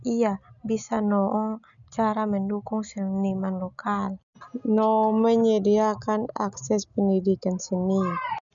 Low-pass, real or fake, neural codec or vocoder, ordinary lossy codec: 7.2 kHz; real; none; none